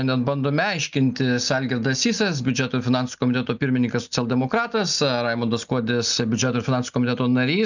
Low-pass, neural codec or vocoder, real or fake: 7.2 kHz; none; real